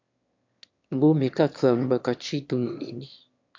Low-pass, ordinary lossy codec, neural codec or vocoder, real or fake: 7.2 kHz; MP3, 48 kbps; autoencoder, 22.05 kHz, a latent of 192 numbers a frame, VITS, trained on one speaker; fake